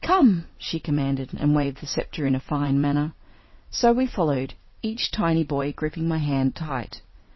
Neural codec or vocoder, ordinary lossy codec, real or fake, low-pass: vocoder, 22.05 kHz, 80 mel bands, WaveNeXt; MP3, 24 kbps; fake; 7.2 kHz